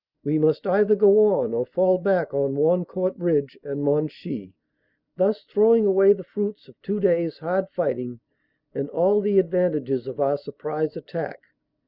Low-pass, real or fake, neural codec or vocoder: 5.4 kHz; real; none